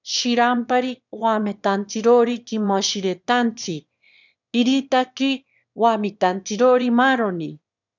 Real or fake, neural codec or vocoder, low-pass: fake; autoencoder, 22.05 kHz, a latent of 192 numbers a frame, VITS, trained on one speaker; 7.2 kHz